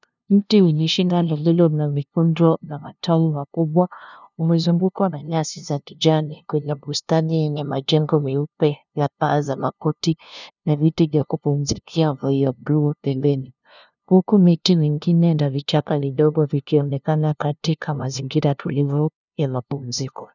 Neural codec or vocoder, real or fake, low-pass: codec, 16 kHz, 0.5 kbps, FunCodec, trained on LibriTTS, 25 frames a second; fake; 7.2 kHz